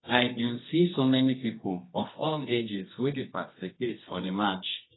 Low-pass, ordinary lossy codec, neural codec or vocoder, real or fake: 7.2 kHz; AAC, 16 kbps; codec, 24 kHz, 0.9 kbps, WavTokenizer, medium music audio release; fake